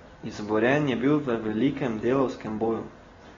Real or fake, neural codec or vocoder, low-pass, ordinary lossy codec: real; none; 7.2 kHz; AAC, 24 kbps